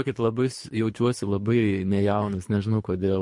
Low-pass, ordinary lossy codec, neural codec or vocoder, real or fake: 10.8 kHz; MP3, 48 kbps; codec, 24 kHz, 3 kbps, HILCodec; fake